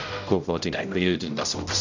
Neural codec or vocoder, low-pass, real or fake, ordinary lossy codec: codec, 16 kHz, 0.5 kbps, X-Codec, HuBERT features, trained on general audio; 7.2 kHz; fake; none